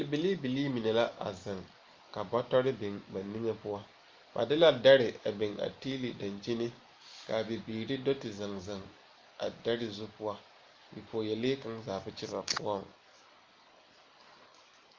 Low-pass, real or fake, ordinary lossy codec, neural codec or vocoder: 7.2 kHz; real; Opus, 32 kbps; none